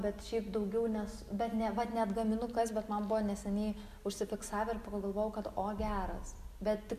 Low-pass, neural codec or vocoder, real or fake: 14.4 kHz; none; real